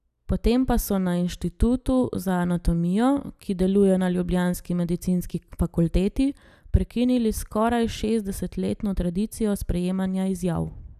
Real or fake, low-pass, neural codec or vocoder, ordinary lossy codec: real; 14.4 kHz; none; none